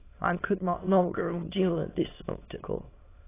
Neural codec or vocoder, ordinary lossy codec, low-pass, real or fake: autoencoder, 22.05 kHz, a latent of 192 numbers a frame, VITS, trained on many speakers; AAC, 16 kbps; 3.6 kHz; fake